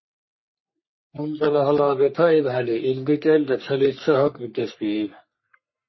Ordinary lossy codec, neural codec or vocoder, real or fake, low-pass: MP3, 24 kbps; codec, 44.1 kHz, 3.4 kbps, Pupu-Codec; fake; 7.2 kHz